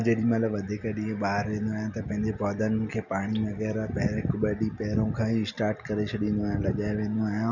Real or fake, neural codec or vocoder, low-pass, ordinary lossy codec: real; none; 7.2 kHz; none